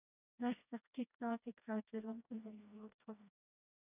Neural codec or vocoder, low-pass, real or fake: codec, 16 kHz, 1 kbps, FreqCodec, smaller model; 3.6 kHz; fake